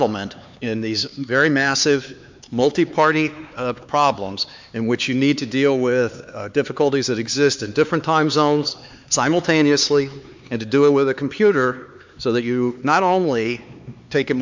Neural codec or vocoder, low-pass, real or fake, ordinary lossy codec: codec, 16 kHz, 4 kbps, X-Codec, HuBERT features, trained on LibriSpeech; 7.2 kHz; fake; MP3, 64 kbps